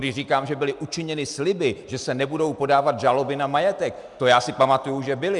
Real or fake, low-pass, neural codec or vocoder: real; 10.8 kHz; none